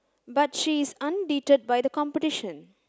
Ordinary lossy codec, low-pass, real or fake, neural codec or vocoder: none; none; real; none